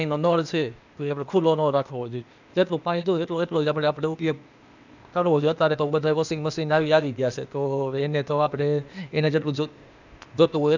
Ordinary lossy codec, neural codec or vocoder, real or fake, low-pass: none; codec, 16 kHz, 0.8 kbps, ZipCodec; fake; 7.2 kHz